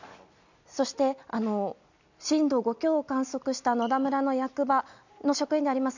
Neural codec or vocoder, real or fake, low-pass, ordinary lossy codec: none; real; 7.2 kHz; none